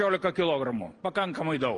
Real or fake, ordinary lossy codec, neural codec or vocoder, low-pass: real; Opus, 32 kbps; none; 10.8 kHz